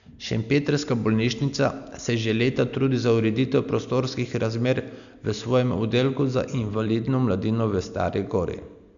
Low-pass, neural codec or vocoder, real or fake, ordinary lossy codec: 7.2 kHz; none; real; none